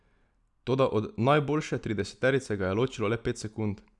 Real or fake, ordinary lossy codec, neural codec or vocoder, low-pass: real; none; none; 10.8 kHz